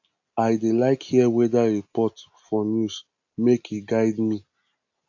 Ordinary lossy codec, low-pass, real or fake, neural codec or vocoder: AAC, 48 kbps; 7.2 kHz; real; none